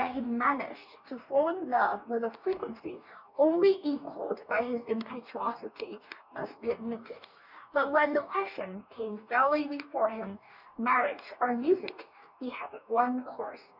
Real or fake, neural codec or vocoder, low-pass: fake; codec, 44.1 kHz, 2.6 kbps, DAC; 5.4 kHz